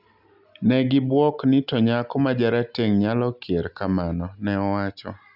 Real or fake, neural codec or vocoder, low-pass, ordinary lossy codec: real; none; 5.4 kHz; none